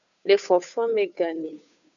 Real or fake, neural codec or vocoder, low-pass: fake; codec, 16 kHz, 2 kbps, FunCodec, trained on Chinese and English, 25 frames a second; 7.2 kHz